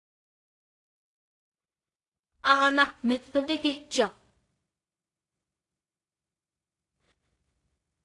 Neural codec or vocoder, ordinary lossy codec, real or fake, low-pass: codec, 16 kHz in and 24 kHz out, 0.4 kbps, LongCat-Audio-Codec, two codebook decoder; AAC, 48 kbps; fake; 10.8 kHz